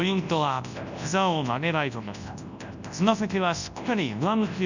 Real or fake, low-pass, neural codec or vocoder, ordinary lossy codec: fake; 7.2 kHz; codec, 24 kHz, 0.9 kbps, WavTokenizer, large speech release; none